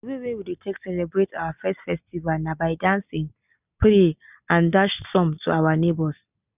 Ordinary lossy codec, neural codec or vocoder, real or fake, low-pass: none; none; real; 3.6 kHz